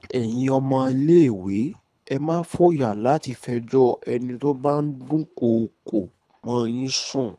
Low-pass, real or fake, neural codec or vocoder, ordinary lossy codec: none; fake; codec, 24 kHz, 3 kbps, HILCodec; none